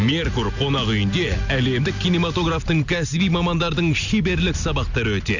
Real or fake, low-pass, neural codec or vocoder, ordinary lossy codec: real; 7.2 kHz; none; none